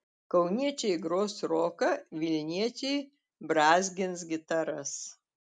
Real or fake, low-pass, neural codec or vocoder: real; 9.9 kHz; none